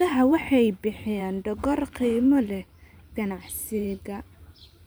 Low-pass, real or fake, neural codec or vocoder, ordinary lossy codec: none; fake; vocoder, 44.1 kHz, 128 mel bands every 512 samples, BigVGAN v2; none